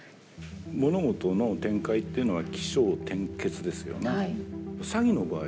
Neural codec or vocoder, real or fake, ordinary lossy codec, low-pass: none; real; none; none